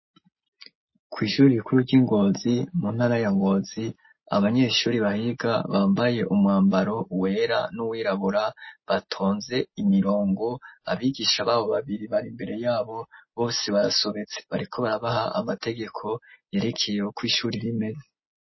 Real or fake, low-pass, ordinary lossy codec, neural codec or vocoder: real; 7.2 kHz; MP3, 24 kbps; none